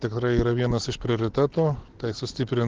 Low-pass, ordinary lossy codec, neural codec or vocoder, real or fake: 7.2 kHz; Opus, 16 kbps; none; real